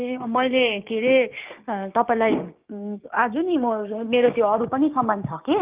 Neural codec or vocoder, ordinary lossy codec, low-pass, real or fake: codec, 16 kHz, 6 kbps, DAC; Opus, 24 kbps; 3.6 kHz; fake